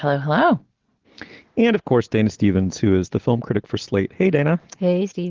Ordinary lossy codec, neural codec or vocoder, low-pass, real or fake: Opus, 16 kbps; none; 7.2 kHz; real